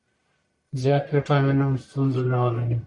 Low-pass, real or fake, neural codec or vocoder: 10.8 kHz; fake; codec, 44.1 kHz, 1.7 kbps, Pupu-Codec